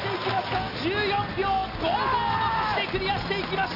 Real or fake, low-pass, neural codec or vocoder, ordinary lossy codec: real; 5.4 kHz; none; none